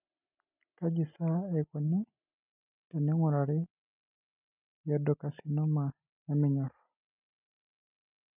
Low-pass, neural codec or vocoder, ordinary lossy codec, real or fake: 3.6 kHz; none; none; real